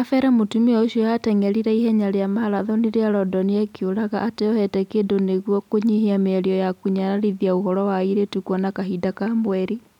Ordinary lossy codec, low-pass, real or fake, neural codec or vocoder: none; 19.8 kHz; real; none